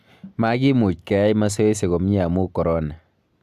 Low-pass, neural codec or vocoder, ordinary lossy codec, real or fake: 14.4 kHz; none; AAC, 96 kbps; real